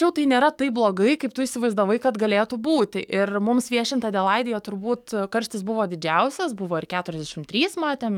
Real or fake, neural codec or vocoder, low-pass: fake; codec, 44.1 kHz, 7.8 kbps, DAC; 19.8 kHz